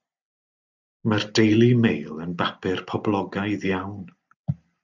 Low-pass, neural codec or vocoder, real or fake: 7.2 kHz; none; real